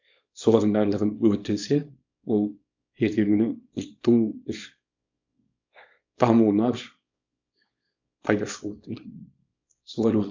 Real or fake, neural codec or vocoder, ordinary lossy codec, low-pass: fake; codec, 24 kHz, 0.9 kbps, WavTokenizer, small release; MP3, 48 kbps; 7.2 kHz